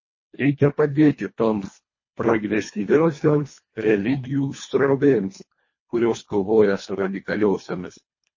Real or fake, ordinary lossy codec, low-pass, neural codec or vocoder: fake; MP3, 32 kbps; 7.2 kHz; codec, 24 kHz, 1.5 kbps, HILCodec